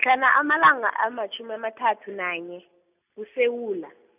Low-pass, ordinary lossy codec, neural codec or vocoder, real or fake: 3.6 kHz; none; none; real